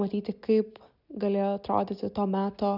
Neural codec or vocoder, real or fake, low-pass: none; real; 5.4 kHz